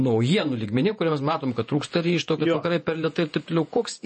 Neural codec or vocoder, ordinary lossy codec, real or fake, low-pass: vocoder, 48 kHz, 128 mel bands, Vocos; MP3, 32 kbps; fake; 10.8 kHz